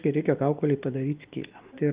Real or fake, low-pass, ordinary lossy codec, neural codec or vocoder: real; 3.6 kHz; Opus, 32 kbps; none